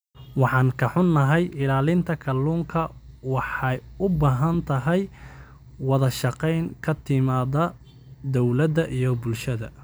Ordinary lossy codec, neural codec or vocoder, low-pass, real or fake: none; none; none; real